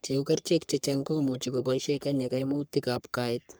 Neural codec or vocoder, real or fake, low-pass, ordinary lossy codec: codec, 44.1 kHz, 3.4 kbps, Pupu-Codec; fake; none; none